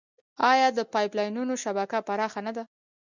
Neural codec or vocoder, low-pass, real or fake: none; 7.2 kHz; real